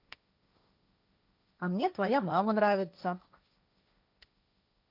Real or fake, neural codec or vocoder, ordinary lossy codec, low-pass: fake; codec, 16 kHz, 1.1 kbps, Voila-Tokenizer; none; 5.4 kHz